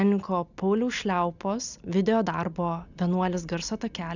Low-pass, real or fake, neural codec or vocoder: 7.2 kHz; real; none